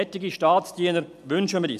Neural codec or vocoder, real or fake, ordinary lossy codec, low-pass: none; real; none; 14.4 kHz